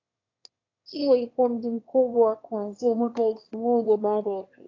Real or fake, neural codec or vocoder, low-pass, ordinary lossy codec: fake; autoencoder, 22.05 kHz, a latent of 192 numbers a frame, VITS, trained on one speaker; 7.2 kHz; AAC, 32 kbps